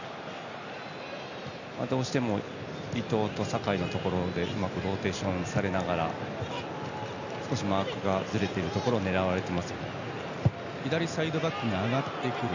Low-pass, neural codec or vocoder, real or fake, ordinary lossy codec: 7.2 kHz; none; real; none